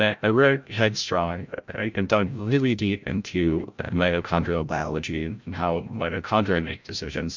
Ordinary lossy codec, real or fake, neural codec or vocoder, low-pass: MP3, 64 kbps; fake; codec, 16 kHz, 0.5 kbps, FreqCodec, larger model; 7.2 kHz